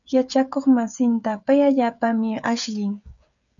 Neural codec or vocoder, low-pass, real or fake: codec, 16 kHz, 16 kbps, FreqCodec, smaller model; 7.2 kHz; fake